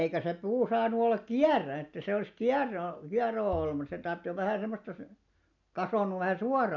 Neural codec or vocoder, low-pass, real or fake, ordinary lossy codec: none; 7.2 kHz; real; none